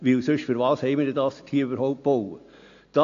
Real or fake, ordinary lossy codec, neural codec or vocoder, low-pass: real; AAC, 48 kbps; none; 7.2 kHz